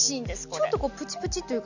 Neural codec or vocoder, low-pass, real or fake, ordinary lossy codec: none; 7.2 kHz; real; none